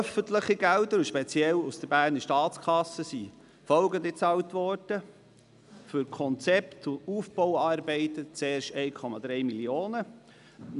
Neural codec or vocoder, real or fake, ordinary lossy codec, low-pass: none; real; none; 10.8 kHz